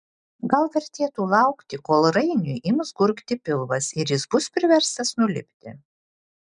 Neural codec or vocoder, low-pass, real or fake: none; 9.9 kHz; real